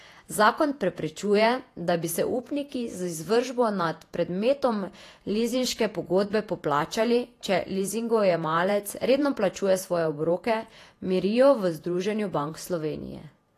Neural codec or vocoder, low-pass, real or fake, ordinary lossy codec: vocoder, 48 kHz, 128 mel bands, Vocos; 14.4 kHz; fake; AAC, 48 kbps